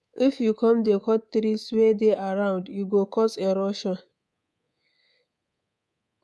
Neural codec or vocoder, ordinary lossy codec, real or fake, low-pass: codec, 24 kHz, 3.1 kbps, DualCodec; none; fake; none